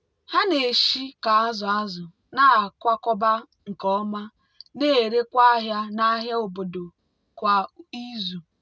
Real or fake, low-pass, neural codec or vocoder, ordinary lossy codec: real; none; none; none